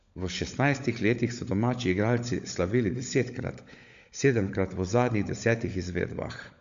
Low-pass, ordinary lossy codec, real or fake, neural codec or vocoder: 7.2 kHz; MP3, 64 kbps; fake; codec, 16 kHz, 16 kbps, FunCodec, trained on LibriTTS, 50 frames a second